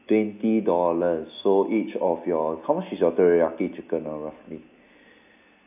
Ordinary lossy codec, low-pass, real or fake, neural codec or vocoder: none; 3.6 kHz; real; none